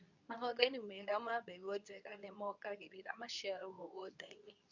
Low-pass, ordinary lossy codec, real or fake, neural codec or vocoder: 7.2 kHz; none; fake; codec, 24 kHz, 0.9 kbps, WavTokenizer, medium speech release version 2